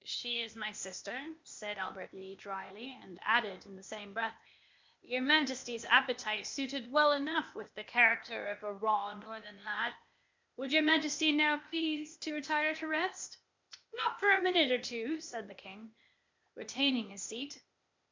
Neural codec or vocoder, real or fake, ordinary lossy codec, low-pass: codec, 16 kHz, 0.8 kbps, ZipCodec; fake; MP3, 64 kbps; 7.2 kHz